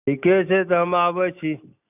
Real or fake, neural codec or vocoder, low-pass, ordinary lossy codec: real; none; 3.6 kHz; none